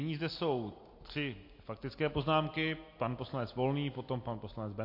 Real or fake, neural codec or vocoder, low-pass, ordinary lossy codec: real; none; 5.4 kHz; MP3, 32 kbps